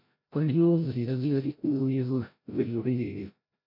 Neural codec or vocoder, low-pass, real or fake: codec, 16 kHz, 0.5 kbps, FreqCodec, larger model; 5.4 kHz; fake